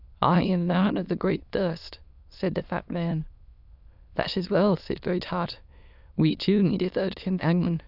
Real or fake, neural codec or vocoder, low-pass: fake; autoencoder, 22.05 kHz, a latent of 192 numbers a frame, VITS, trained on many speakers; 5.4 kHz